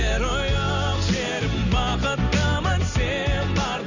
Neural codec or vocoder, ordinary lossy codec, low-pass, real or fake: none; none; 7.2 kHz; real